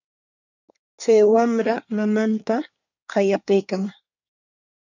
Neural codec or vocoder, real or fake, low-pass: codec, 24 kHz, 1 kbps, SNAC; fake; 7.2 kHz